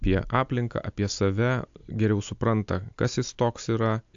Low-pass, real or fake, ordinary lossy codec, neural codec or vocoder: 7.2 kHz; real; AAC, 64 kbps; none